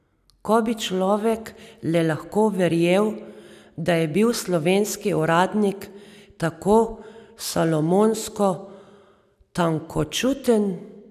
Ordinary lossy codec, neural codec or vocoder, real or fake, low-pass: none; none; real; 14.4 kHz